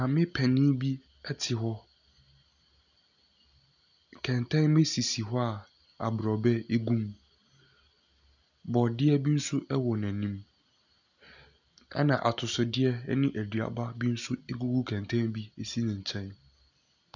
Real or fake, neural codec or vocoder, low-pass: real; none; 7.2 kHz